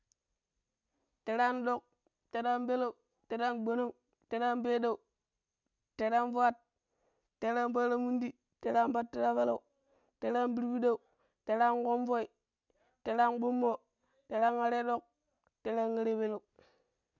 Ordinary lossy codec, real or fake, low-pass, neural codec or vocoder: none; real; 7.2 kHz; none